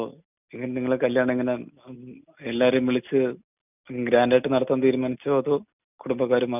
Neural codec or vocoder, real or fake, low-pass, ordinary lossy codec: none; real; 3.6 kHz; none